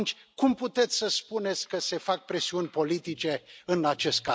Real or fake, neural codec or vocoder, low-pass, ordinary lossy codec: real; none; none; none